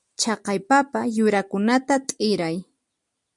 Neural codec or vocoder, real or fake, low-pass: none; real; 10.8 kHz